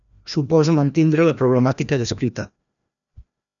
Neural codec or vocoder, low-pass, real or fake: codec, 16 kHz, 1 kbps, FreqCodec, larger model; 7.2 kHz; fake